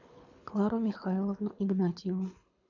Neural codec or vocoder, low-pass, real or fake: codec, 24 kHz, 6 kbps, HILCodec; 7.2 kHz; fake